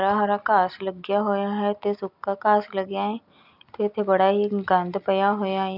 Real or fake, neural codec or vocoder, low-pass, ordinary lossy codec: real; none; 5.4 kHz; none